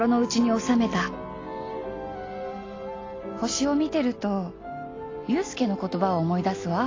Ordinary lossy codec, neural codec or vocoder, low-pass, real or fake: AAC, 32 kbps; none; 7.2 kHz; real